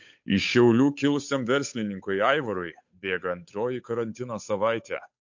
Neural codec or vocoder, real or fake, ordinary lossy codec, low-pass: codec, 16 kHz, 8 kbps, FunCodec, trained on Chinese and English, 25 frames a second; fake; MP3, 48 kbps; 7.2 kHz